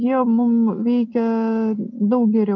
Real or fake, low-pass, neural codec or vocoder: real; 7.2 kHz; none